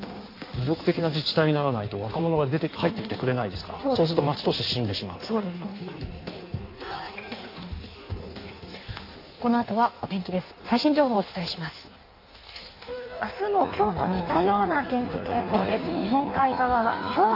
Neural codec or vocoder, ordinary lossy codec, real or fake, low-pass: codec, 16 kHz in and 24 kHz out, 1.1 kbps, FireRedTTS-2 codec; AAC, 32 kbps; fake; 5.4 kHz